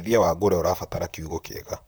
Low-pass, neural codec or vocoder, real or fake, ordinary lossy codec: none; vocoder, 44.1 kHz, 128 mel bands, Pupu-Vocoder; fake; none